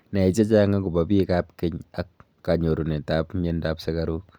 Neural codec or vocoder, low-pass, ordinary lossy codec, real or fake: none; none; none; real